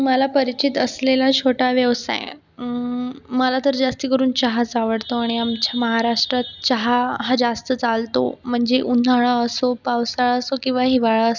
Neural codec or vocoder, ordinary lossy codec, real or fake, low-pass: none; none; real; 7.2 kHz